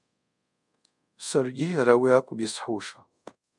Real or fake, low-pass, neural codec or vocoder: fake; 10.8 kHz; codec, 24 kHz, 0.5 kbps, DualCodec